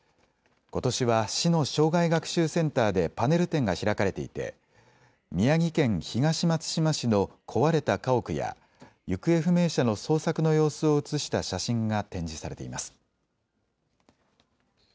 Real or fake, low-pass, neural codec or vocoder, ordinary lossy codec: real; none; none; none